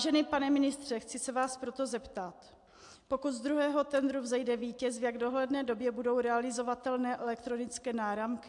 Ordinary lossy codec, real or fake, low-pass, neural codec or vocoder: AAC, 64 kbps; real; 10.8 kHz; none